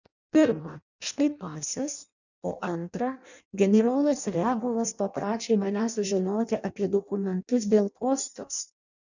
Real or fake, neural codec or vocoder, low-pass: fake; codec, 16 kHz in and 24 kHz out, 0.6 kbps, FireRedTTS-2 codec; 7.2 kHz